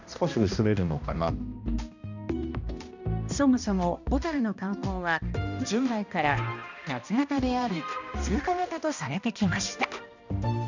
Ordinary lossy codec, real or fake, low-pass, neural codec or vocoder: none; fake; 7.2 kHz; codec, 16 kHz, 1 kbps, X-Codec, HuBERT features, trained on balanced general audio